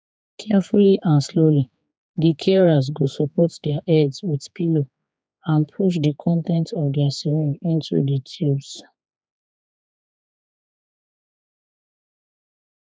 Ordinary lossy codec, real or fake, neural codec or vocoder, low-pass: none; fake; codec, 16 kHz, 4 kbps, X-Codec, HuBERT features, trained on general audio; none